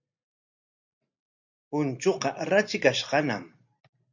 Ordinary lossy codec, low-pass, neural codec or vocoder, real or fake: MP3, 64 kbps; 7.2 kHz; none; real